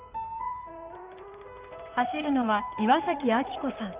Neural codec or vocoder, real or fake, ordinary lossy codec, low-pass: codec, 16 kHz in and 24 kHz out, 2.2 kbps, FireRedTTS-2 codec; fake; Opus, 32 kbps; 3.6 kHz